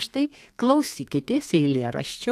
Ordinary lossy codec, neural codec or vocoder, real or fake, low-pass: AAC, 64 kbps; codec, 32 kHz, 1.9 kbps, SNAC; fake; 14.4 kHz